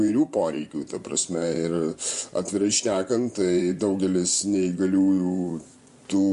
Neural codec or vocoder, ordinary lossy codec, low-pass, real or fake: vocoder, 24 kHz, 100 mel bands, Vocos; MP3, 64 kbps; 10.8 kHz; fake